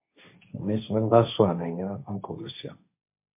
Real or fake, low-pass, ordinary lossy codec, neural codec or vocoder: fake; 3.6 kHz; MP3, 24 kbps; codec, 16 kHz, 1.1 kbps, Voila-Tokenizer